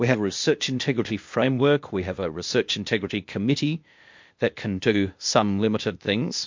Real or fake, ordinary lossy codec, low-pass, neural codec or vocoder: fake; MP3, 48 kbps; 7.2 kHz; codec, 16 kHz, 0.8 kbps, ZipCodec